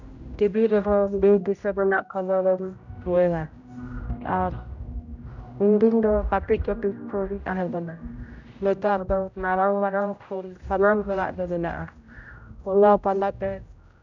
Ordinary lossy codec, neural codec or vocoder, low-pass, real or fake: none; codec, 16 kHz, 0.5 kbps, X-Codec, HuBERT features, trained on general audio; 7.2 kHz; fake